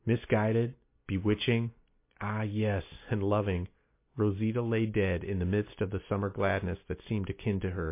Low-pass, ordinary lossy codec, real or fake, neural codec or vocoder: 3.6 kHz; MP3, 24 kbps; real; none